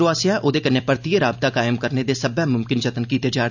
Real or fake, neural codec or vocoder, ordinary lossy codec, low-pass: real; none; none; 7.2 kHz